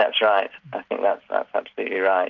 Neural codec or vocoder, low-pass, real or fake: none; 7.2 kHz; real